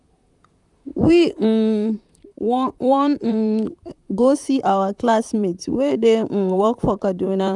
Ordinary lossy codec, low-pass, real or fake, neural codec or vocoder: none; 10.8 kHz; fake; vocoder, 44.1 kHz, 128 mel bands, Pupu-Vocoder